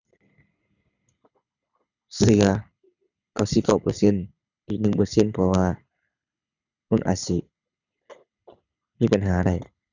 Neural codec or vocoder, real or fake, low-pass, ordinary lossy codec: codec, 24 kHz, 6 kbps, HILCodec; fake; 7.2 kHz; none